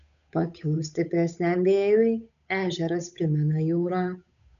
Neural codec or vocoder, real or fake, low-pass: codec, 16 kHz, 8 kbps, FunCodec, trained on Chinese and English, 25 frames a second; fake; 7.2 kHz